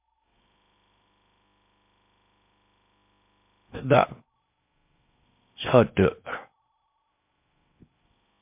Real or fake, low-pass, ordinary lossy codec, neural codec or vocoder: fake; 3.6 kHz; MP3, 32 kbps; codec, 16 kHz in and 24 kHz out, 0.8 kbps, FocalCodec, streaming, 65536 codes